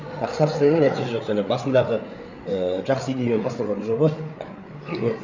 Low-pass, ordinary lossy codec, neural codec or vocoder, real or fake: 7.2 kHz; none; codec, 16 kHz, 8 kbps, FreqCodec, larger model; fake